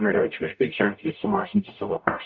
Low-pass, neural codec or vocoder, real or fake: 7.2 kHz; codec, 44.1 kHz, 0.9 kbps, DAC; fake